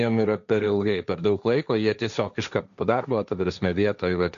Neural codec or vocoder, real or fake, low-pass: codec, 16 kHz, 1.1 kbps, Voila-Tokenizer; fake; 7.2 kHz